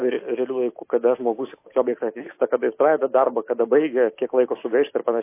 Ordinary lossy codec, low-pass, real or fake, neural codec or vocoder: MP3, 24 kbps; 3.6 kHz; real; none